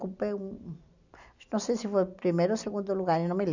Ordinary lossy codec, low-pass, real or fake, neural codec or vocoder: none; 7.2 kHz; real; none